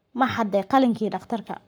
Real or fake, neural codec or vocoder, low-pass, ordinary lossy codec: fake; codec, 44.1 kHz, 7.8 kbps, Pupu-Codec; none; none